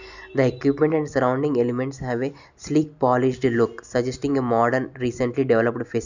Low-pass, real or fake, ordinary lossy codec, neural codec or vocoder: 7.2 kHz; real; none; none